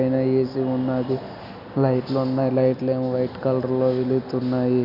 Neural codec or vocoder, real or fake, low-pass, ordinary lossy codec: none; real; 5.4 kHz; none